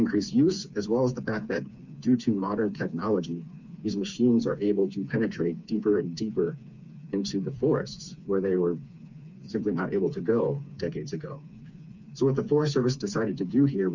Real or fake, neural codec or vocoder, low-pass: fake; codec, 16 kHz, 4 kbps, FreqCodec, smaller model; 7.2 kHz